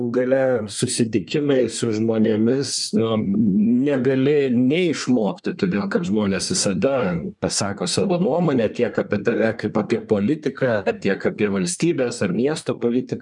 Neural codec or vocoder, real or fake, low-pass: codec, 24 kHz, 1 kbps, SNAC; fake; 10.8 kHz